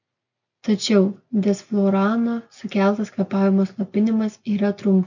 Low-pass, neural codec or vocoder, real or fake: 7.2 kHz; none; real